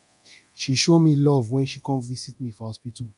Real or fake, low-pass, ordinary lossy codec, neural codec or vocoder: fake; 10.8 kHz; none; codec, 24 kHz, 0.9 kbps, DualCodec